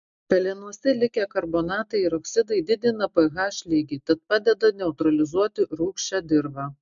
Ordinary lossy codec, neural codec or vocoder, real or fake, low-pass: MP3, 64 kbps; none; real; 7.2 kHz